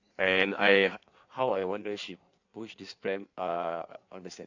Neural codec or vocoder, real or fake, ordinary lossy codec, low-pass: codec, 16 kHz in and 24 kHz out, 1.1 kbps, FireRedTTS-2 codec; fake; AAC, 48 kbps; 7.2 kHz